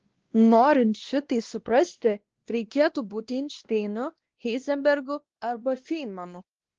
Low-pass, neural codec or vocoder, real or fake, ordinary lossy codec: 7.2 kHz; codec, 16 kHz, 1 kbps, X-Codec, WavLM features, trained on Multilingual LibriSpeech; fake; Opus, 16 kbps